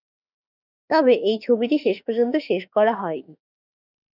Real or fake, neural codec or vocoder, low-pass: fake; autoencoder, 48 kHz, 32 numbers a frame, DAC-VAE, trained on Japanese speech; 5.4 kHz